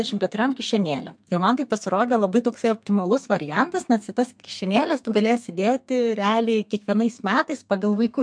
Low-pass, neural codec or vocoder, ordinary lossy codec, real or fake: 9.9 kHz; codec, 32 kHz, 1.9 kbps, SNAC; MP3, 64 kbps; fake